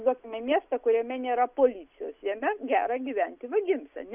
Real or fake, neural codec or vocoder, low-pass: real; none; 3.6 kHz